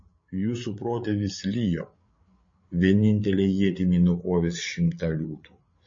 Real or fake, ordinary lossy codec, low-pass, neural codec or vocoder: fake; MP3, 32 kbps; 7.2 kHz; codec, 16 kHz, 8 kbps, FreqCodec, larger model